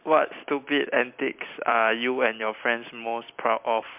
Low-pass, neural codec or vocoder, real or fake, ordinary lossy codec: 3.6 kHz; vocoder, 44.1 kHz, 128 mel bands every 256 samples, BigVGAN v2; fake; MP3, 32 kbps